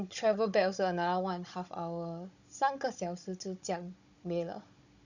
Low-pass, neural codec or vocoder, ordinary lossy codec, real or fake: 7.2 kHz; codec, 16 kHz, 16 kbps, FunCodec, trained on Chinese and English, 50 frames a second; none; fake